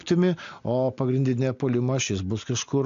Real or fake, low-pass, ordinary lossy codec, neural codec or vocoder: real; 7.2 kHz; AAC, 64 kbps; none